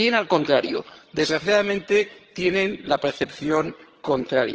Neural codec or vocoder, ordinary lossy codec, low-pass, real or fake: vocoder, 22.05 kHz, 80 mel bands, HiFi-GAN; Opus, 16 kbps; 7.2 kHz; fake